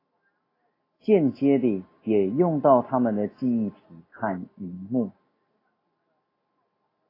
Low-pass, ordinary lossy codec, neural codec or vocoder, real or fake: 5.4 kHz; AAC, 24 kbps; none; real